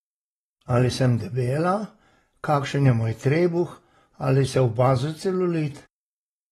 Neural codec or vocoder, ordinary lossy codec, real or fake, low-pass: none; AAC, 32 kbps; real; 19.8 kHz